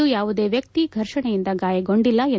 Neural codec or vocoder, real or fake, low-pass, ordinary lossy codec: none; real; none; none